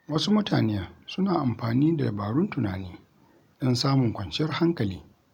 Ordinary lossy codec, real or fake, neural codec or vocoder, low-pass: none; real; none; 19.8 kHz